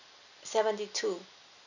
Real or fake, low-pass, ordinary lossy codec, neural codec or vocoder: real; 7.2 kHz; none; none